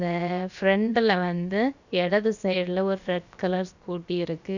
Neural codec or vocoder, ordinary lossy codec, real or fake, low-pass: codec, 16 kHz, about 1 kbps, DyCAST, with the encoder's durations; none; fake; 7.2 kHz